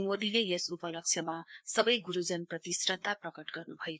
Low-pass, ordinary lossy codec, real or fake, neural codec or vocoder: none; none; fake; codec, 16 kHz, 2 kbps, FreqCodec, larger model